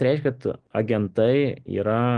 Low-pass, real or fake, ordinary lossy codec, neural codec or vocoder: 9.9 kHz; real; Opus, 24 kbps; none